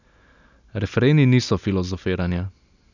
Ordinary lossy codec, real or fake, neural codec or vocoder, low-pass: none; real; none; 7.2 kHz